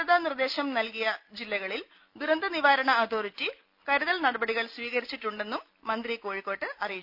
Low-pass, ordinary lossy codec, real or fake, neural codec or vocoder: 5.4 kHz; none; real; none